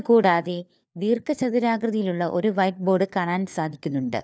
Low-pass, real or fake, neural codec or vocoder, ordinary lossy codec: none; fake; codec, 16 kHz, 4 kbps, FunCodec, trained on LibriTTS, 50 frames a second; none